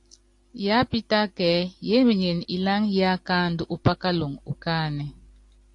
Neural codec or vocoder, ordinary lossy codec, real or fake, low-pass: none; AAC, 48 kbps; real; 10.8 kHz